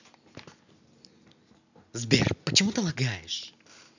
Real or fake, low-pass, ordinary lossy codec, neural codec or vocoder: real; 7.2 kHz; none; none